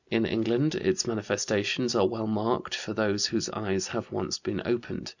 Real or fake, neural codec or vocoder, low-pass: real; none; 7.2 kHz